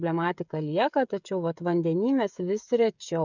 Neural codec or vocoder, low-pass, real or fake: codec, 16 kHz, 16 kbps, FreqCodec, smaller model; 7.2 kHz; fake